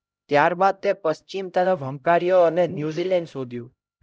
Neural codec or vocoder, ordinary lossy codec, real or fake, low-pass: codec, 16 kHz, 0.5 kbps, X-Codec, HuBERT features, trained on LibriSpeech; none; fake; none